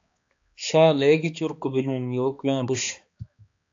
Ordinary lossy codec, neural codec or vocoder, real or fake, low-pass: AAC, 64 kbps; codec, 16 kHz, 2 kbps, X-Codec, HuBERT features, trained on balanced general audio; fake; 7.2 kHz